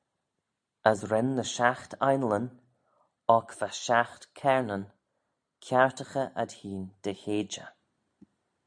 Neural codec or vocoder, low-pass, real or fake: none; 9.9 kHz; real